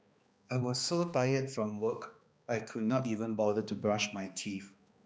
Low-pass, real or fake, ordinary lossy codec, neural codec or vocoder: none; fake; none; codec, 16 kHz, 2 kbps, X-Codec, HuBERT features, trained on balanced general audio